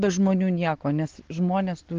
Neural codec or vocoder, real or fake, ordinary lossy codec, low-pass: none; real; Opus, 16 kbps; 7.2 kHz